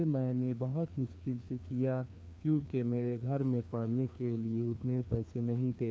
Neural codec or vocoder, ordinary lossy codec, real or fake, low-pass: codec, 16 kHz, 2 kbps, FreqCodec, larger model; none; fake; none